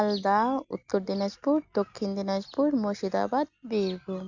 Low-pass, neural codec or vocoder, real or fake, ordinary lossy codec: 7.2 kHz; none; real; none